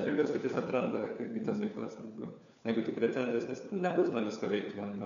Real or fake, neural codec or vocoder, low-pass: fake; codec, 16 kHz, 4 kbps, FunCodec, trained on LibriTTS, 50 frames a second; 7.2 kHz